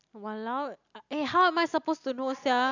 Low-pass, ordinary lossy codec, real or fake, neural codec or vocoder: 7.2 kHz; none; real; none